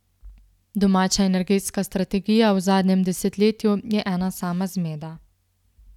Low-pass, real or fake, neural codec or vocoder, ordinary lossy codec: 19.8 kHz; real; none; none